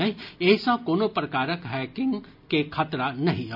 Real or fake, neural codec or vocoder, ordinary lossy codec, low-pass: real; none; none; 5.4 kHz